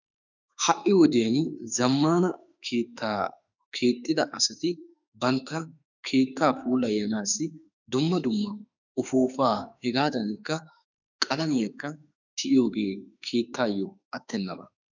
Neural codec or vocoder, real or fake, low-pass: autoencoder, 48 kHz, 32 numbers a frame, DAC-VAE, trained on Japanese speech; fake; 7.2 kHz